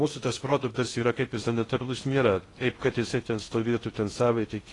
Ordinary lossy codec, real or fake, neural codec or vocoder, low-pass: AAC, 32 kbps; fake; codec, 16 kHz in and 24 kHz out, 0.6 kbps, FocalCodec, streaming, 2048 codes; 10.8 kHz